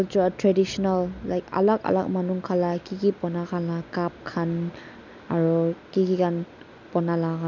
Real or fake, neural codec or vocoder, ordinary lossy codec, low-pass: real; none; none; 7.2 kHz